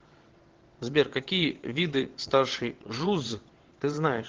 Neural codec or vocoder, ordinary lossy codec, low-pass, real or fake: vocoder, 22.05 kHz, 80 mel bands, WaveNeXt; Opus, 16 kbps; 7.2 kHz; fake